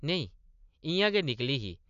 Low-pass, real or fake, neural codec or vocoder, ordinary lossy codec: 7.2 kHz; real; none; none